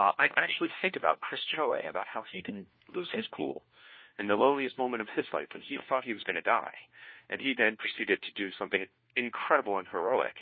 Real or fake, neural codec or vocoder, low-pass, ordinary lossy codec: fake; codec, 16 kHz, 1 kbps, FunCodec, trained on LibriTTS, 50 frames a second; 7.2 kHz; MP3, 24 kbps